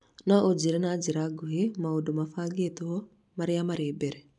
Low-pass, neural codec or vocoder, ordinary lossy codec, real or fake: 10.8 kHz; none; none; real